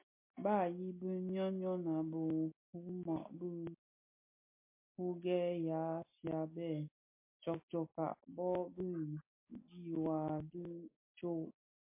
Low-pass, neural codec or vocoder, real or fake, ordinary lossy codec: 3.6 kHz; none; real; MP3, 24 kbps